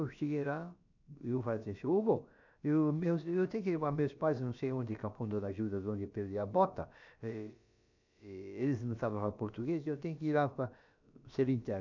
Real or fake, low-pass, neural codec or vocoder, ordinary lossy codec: fake; 7.2 kHz; codec, 16 kHz, about 1 kbps, DyCAST, with the encoder's durations; none